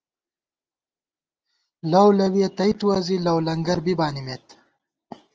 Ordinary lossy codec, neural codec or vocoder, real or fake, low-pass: Opus, 24 kbps; none; real; 7.2 kHz